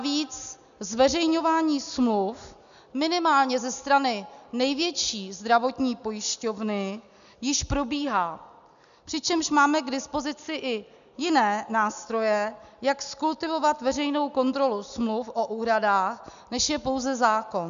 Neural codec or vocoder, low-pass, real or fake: none; 7.2 kHz; real